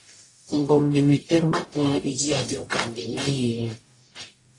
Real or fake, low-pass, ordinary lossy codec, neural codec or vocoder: fake; 10.8 kHz; AAC, 32 kbps; codec, 44.1 kHz, 0.9 kbps, DAC